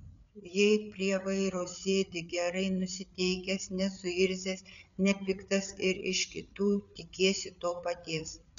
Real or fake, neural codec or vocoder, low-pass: fake; codec, 16 kHz, 8 kbps, FreqCodec, larger model; 7.2 kHz